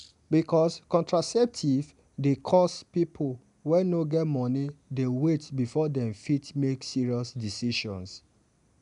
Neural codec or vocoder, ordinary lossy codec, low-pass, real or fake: none; none; 10.8 kHz; real